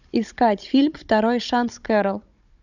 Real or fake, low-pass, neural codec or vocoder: fake; 7.2 kHz; codec, 16 kHz, 16 kbps, FunCodec, trained on Chinese and English, 50 frames a second